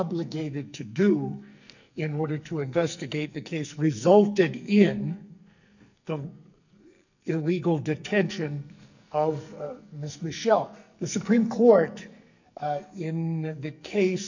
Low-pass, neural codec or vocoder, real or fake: 7.2 kHz; codec, 44.1 kHz, 2.6 kbps, SNAC; fake